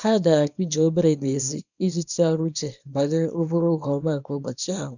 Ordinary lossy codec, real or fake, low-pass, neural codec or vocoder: none; fake; 7.2 kHz; codec, 24 kHz, 0.9 kbps, WavTokenizer, small release